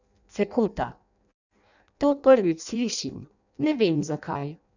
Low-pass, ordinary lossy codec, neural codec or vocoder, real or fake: 7.2 kHz; none; codec, 16 kHz in and 24 kHz out, 0.6 kbps, FireRedTTS-2 codec; fake